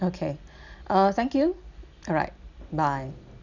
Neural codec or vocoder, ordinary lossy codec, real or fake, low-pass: none; none; real; 7.2 kHz